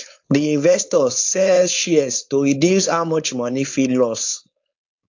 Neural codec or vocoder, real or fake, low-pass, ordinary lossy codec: codec, 16 kHz, 4.8 kbps, FACodec; fake; 7.2 kHz; AAC, 48 kbps